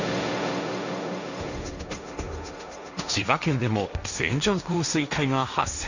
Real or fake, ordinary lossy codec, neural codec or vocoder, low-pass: fake; none; codec, 16 kHz, 1.1 kbps, Voila-Tokenizer; 7.2 kHz